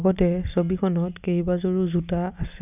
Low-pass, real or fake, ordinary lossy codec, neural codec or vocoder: 3.6 kHz; real; none; none